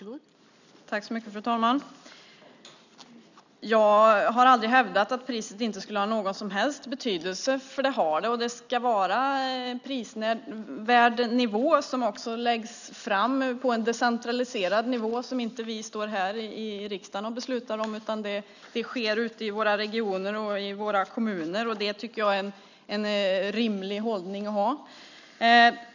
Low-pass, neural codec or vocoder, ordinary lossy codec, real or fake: 7.2 kHz; none; none; real